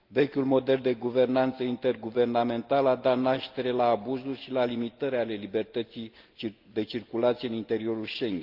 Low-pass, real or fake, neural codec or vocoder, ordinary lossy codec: 5.4 kHz; real; none; Opus, 24 kbps